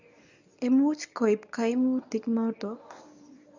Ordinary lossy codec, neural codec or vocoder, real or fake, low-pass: none; codec, 24 kHz, 0.9 kbps, WavTokenizer, medium speech release version 1; fake; 7.2 kHz